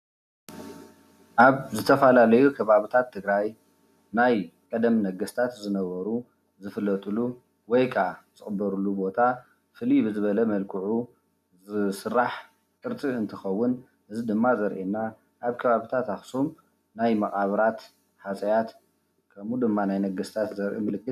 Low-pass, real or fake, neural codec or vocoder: 14.4 kHz; real; none